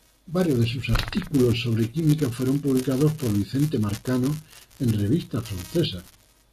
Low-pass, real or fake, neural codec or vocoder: 14.4 kHz; real; none